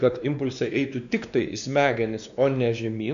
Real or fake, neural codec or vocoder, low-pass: fake; codec, 16 kHz, 2 kbps, X-Codec, WavLM features, trained on Multilingual LibriSpeech; 7.2 kHz